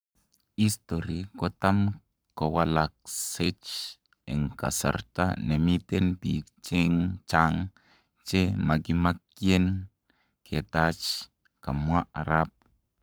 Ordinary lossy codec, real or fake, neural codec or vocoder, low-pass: none; fake; codec, 44.1 kHz, 7.8 kbps, Pupu-Codec; none